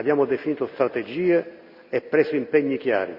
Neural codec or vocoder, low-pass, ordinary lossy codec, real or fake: none; 5.4 kHz; Opus, 64 kbps; real